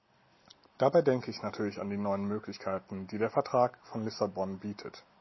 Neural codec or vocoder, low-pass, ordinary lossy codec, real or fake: none; 7.2 kHz; MP3, 24 kbps; real